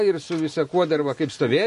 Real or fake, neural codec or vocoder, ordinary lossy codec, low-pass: real; none; MP3, 48 kbps; 14.4 kHz